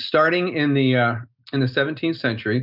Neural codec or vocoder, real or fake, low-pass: none; real; 5.4 kHz